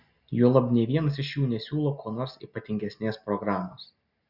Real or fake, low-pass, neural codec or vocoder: real; 5.4 kHz; none